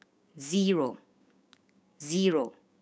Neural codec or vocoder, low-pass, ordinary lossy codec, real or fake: codec, 16 kHz, 6 kbps, DAC; none; none; fake